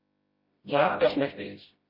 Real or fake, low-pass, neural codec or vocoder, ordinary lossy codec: fake; 5.4 kHz; codec, 16 kHz, 0.5 kbps, FreqCodec, smaller model; MP3, 24 kbps